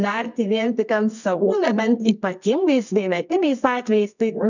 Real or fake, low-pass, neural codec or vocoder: fake; 7.2 kHz; codec, 24 kHz, 0.9 kbps, WavTokenizer, medium music audio release